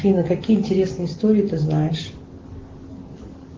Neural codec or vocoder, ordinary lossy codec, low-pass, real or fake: none; Opus, 16 kbps; 7.2 kHz; real